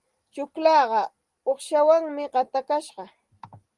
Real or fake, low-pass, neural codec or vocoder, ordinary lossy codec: real; 10.8 kHz; none; Opus, 24 kbps